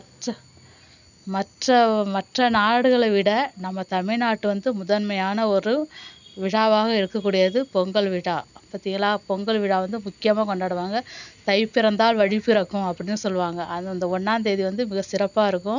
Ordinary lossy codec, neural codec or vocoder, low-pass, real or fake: none; none; 7.2 kHz; real